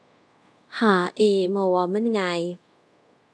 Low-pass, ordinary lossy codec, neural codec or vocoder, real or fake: none; none; codec, 24 kHz, 0.5 kbps, DualCodec; fake